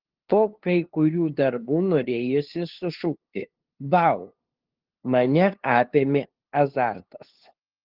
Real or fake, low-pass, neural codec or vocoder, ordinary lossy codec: fake; 5.4 kHz; codec, 16 kHz, 2 kbps, FunCodec, trained on LibriTTS, 25 frames a second; Opus, 16 kbps